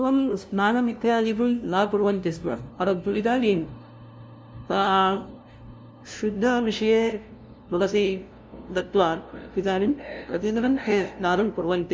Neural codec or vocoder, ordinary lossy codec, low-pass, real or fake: codec, 16 kHz, 0.5 kbps, FunCodec, trained on LibriTTS, 25 frames a second; none; none; fake